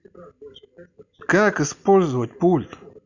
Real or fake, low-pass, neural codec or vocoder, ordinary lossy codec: real; 7.2 kHz; none; none